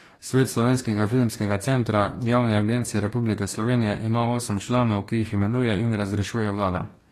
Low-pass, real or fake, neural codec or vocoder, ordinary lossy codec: 14.4 kHz; fake; codec, 44.1 kHz, 2.6 kbps, DAC; AAC, 48 kbps